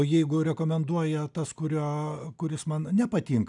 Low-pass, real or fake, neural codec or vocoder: 10.8 kHz; real; none